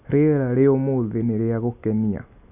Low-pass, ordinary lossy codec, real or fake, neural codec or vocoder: 3.6 kHz; none; real; none